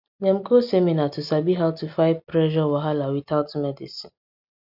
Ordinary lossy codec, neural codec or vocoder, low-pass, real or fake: none; none; 5.4 kHz; real